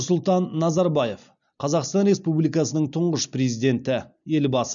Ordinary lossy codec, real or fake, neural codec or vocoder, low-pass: none; real; none; 7.2 kHz